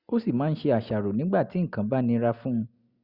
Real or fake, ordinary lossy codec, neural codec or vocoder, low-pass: real; Opus, 64 kbps; none; 5.4 kHz